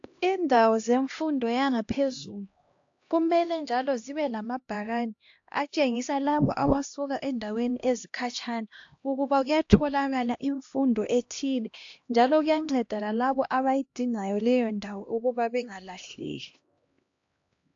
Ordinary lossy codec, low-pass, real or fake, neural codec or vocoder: AAC, 48 kbps; 7.2 kHz; fake; codec, 16 kHz, 1 kbps, X-Codec, HuBERT features, trained on LibriSpeech